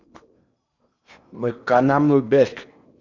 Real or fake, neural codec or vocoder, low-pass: fake; codec, 16 kHz in and 24 kHz out, 0.8 kbps, FocalCodec, streaming, 65536 codes; 7.2 kHz